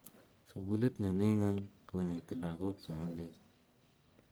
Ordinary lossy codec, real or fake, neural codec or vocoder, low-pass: none; fake; codec, 44.1 kHz, 1.7 kbps, Pupu-Codec; none